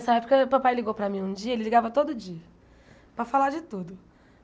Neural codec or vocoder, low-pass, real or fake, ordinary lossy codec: none; none; real; none